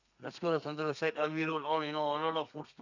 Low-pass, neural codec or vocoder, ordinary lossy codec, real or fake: 7.2 kHz; codec, 32 kHz, 1.9 kbps, SNAC; none; fake